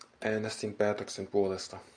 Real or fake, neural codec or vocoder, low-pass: real; none; 9.9 kHz